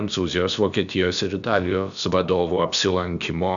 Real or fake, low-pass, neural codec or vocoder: fake; 7.2 kHz; codec, 16 kHz, about 1 kbps, DyCAST, with the encoder's durations